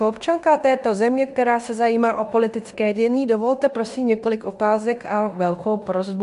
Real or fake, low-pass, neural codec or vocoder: fake; 10.8 kHz; codec, 16 kHz in and 24 kHz out, 0.9 kbps, LongCat-Audio-Codec, fine tuned four codebook decoder